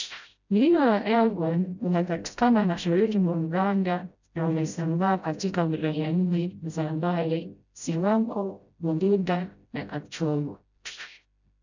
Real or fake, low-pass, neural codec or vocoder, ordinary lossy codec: fake; 7.2 kHz; codec, 16 kHz, 0.5 kbps, FreqCodec, smaller model; none